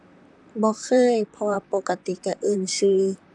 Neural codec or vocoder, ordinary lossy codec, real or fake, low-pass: vocoder, 44.1 kHz, 128 mel bands, Pupu-Vocoder; none; fake; 10.8 kHz